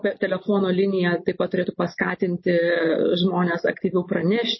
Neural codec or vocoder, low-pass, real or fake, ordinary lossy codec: none; 7.2 kHz; real; MP3, 24 kbps